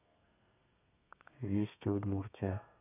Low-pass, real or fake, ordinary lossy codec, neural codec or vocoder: 3.6 kHz; fake; none; codec, 32 kHz, 1.9 kbps, SNAC